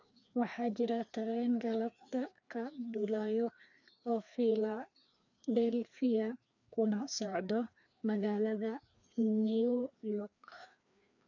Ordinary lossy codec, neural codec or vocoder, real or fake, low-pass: none; codec, 16 kHz, 2 kbps, FreqCodec, larger model; fake; 7.2 kHz